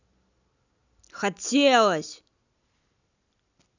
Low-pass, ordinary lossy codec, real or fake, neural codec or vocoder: 7.2 kHz; none; real; none